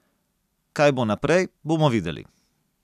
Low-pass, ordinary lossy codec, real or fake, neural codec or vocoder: 14.4 kHz; none; real; none